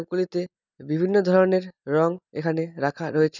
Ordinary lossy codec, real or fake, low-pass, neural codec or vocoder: none; real; 7.2 kHz; none